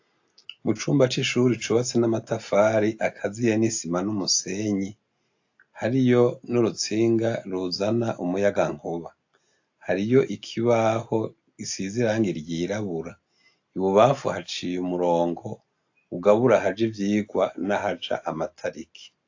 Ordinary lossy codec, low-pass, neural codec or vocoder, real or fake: AAC, 48 kbps; 7.2 kHz; none; real